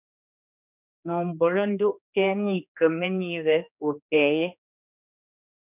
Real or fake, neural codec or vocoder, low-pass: fake; codec, 16 kHz, 2 kbps, X-Codec, HuBERT features, trained on general audio; 3.6 kHz